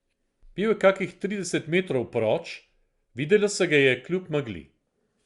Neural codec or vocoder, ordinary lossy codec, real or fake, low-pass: none; Opus, 64 kbps; real; 10.8 kHz